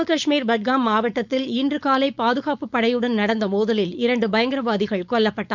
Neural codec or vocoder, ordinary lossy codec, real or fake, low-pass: codec, 16 kHz, 4.8 kbps, FACodec; none; fake; 7.2 kHz